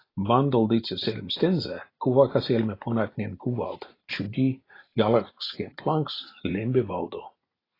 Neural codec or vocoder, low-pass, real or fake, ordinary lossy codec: none; 5.4 kHz; real; AAC, 24 kbps